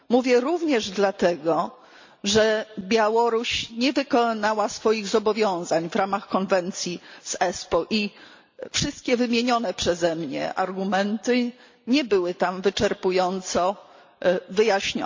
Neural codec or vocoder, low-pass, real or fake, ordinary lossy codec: none; 7.2 kHz; real; MP3, 48 kbps